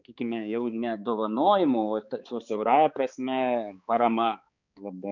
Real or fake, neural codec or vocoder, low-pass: fake; codec, 16 kHz, 4 kbps, X-Codec, HuBERT features, trained on balanced general audio; 7.2 kHz